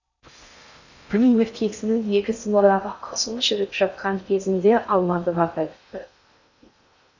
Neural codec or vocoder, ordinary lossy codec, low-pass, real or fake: codec, 16 kHz in and 24 kHz out, 0.6 kbps, FocalCodec, streaming, 4096 codes; none; 7.2 kHz; fake